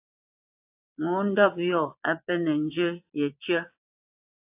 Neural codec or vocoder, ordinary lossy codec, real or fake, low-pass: vocoder, 22.05 kHz, 80 mel bands, Vocos; AAC, 32 kbps; fake; 3.6 kHz